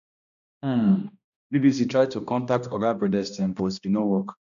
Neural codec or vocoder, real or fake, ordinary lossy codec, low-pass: codec, 16 kHz, 1 kbps, X-Codec, HuBERT features, trained on balanced general audio; fake; none; 7.2 kHz